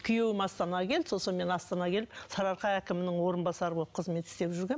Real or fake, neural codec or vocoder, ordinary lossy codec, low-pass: real; none; none; none